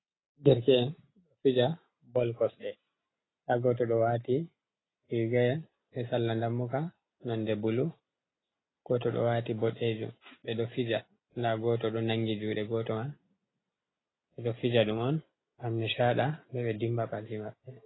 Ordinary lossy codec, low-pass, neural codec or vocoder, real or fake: AAC, 16 kbps; 7.2 kHz; none; real